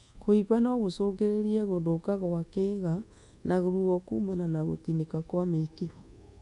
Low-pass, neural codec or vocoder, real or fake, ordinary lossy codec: 10.8 kHz; codec, 24 kHz, 1.2 kbps, DualCodec; fake; none